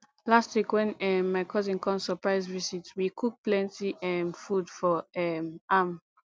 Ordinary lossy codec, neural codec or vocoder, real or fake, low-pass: none; none; real; none